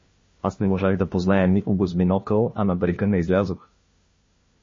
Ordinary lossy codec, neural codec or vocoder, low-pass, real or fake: MP3, 32 kbps; codec, 16 kHz, 1 kbps, FunCodec, trained on LibriTTS, 50 frames a second; 7.2 kHz; fake